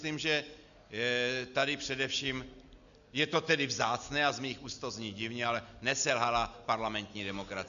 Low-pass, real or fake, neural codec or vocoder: 7.2 kHz; real; none